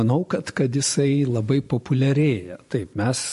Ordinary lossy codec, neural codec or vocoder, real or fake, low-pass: MP3, 48 kbps; vocoder, 44.1 kHz, 128 mel bands every 512 samples, BigVGAN v2; fake; 14.4 kHz